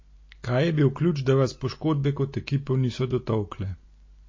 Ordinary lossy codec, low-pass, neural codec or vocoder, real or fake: MP3, 32 kbps; 7.2 kHz; none; real